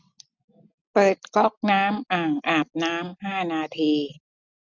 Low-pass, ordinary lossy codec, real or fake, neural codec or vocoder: 7.2 kHz; none; real; none